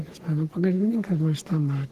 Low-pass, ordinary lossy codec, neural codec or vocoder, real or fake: 14.4 kHz; Opus, 16 kbps; codec, 44.1 kHz, 3.4 kbps, Pupu-Codec; fake